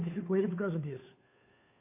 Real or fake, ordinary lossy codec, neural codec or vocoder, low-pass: fake; MP3, 24 kbps; codec, 16 kHz, 2 kbps, FunCodec, trained on Chinese and English, 25 frames a second; 3.6 kHz